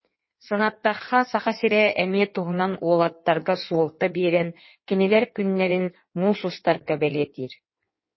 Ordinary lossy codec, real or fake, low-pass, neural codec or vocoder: MP3, 24 kbps; fake; 7.2 kHz; codec, 16 kHz in and 24 kHz out, 1.1 kbps, FireRedTTS-2 codec